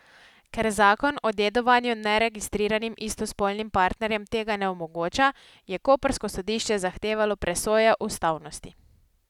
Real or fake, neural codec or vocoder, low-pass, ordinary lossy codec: real; none; 19.8 kHz; none